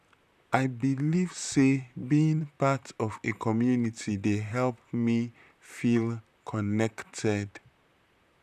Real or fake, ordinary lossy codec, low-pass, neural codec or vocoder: fake; none; 14.4 kHz; vocoder, 44.1 kHz, 128 mel bands, Pupu-Vocoder